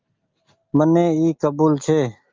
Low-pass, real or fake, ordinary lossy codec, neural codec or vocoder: 7.2 kHz; real; Opus, 24 kbps; none